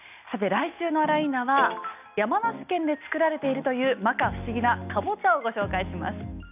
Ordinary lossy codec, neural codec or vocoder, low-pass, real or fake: none; none; 3.6 kHz; real